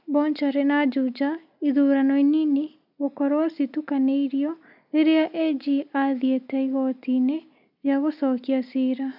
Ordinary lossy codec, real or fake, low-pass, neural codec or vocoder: none; real; 5.4 kHz; none